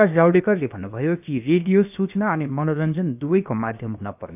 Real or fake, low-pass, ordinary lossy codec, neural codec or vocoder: fake; 3.6 kHz; none; codec, 16 kHz, about 1 kbps, DyCAST, with the encoder's durations